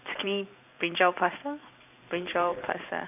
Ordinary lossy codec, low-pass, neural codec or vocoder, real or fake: none; 3.6 kHz; vocoder, 44.1 kHz, 128 mel bands every 512 samples, BigVGAN v2; fake